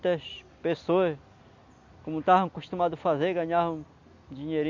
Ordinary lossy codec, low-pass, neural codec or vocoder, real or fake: Opus, 64 kbps; 7.2 kHz; none; real